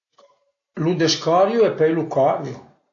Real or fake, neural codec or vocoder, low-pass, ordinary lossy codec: real; none; 7.2 kHz; AAC, 32 kbps